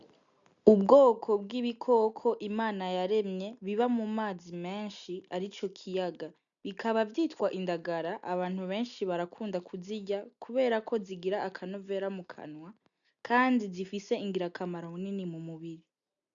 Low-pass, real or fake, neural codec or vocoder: 7.2 kHz; real; none